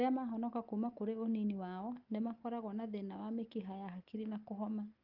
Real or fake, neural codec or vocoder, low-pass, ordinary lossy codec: real; none; 5.4 kHz; Opus, 32 kbps